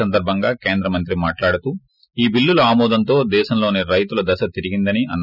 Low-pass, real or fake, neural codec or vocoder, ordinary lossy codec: 5.4 kHz; real; none; none